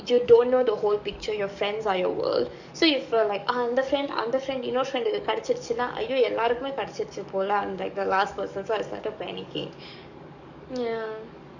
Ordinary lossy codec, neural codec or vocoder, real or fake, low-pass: none; codec, 44.1 kHz, 7.8 kbps, DAC; fake; 7.2 kHz